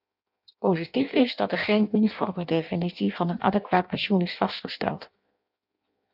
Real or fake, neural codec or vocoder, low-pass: fake; codec, 16 kHz in and 24 kHz out, 0.6 kbps, FireRedTTS-2 codec; 5.4 kHz